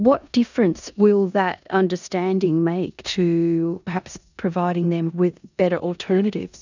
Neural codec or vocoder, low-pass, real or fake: codec, 16 kHz in and 24 kHz out, 0.9 kbps, LongCat-Audio-Codec, four codebook decoder; 7.2 kHz; fake